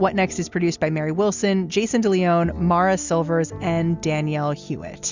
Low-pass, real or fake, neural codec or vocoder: 7.2 kHz; real; none